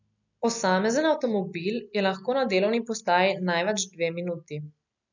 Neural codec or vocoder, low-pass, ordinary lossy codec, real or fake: none; 7.2 kHz; none; real